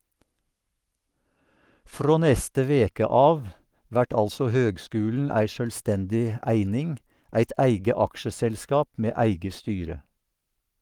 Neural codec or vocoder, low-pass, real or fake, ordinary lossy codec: codec, 44.1 kHz, 7.8 kbps, Pupu-Codec; 19.8 kHz; fake; Opus, 24 kbps